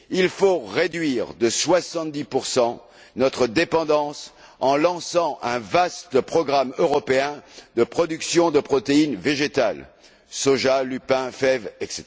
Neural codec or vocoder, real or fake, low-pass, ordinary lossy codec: none; real; none; none